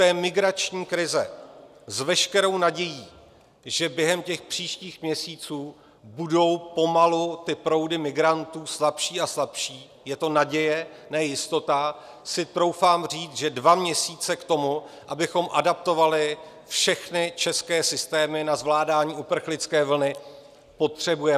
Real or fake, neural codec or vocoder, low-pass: real; none; 14.4 kHz